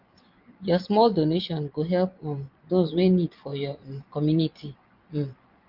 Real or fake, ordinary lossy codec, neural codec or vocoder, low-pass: fake; Opus, 24 kbps; vocoder, 24 kHz, 100 mel bands, Vocos; 5.4 kHz